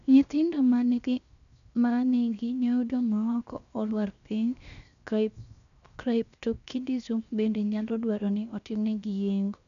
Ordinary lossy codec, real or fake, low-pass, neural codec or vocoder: none; fake; 7.2 kHz; codec, 16 kHz, 0.7 kbps, FocalCodec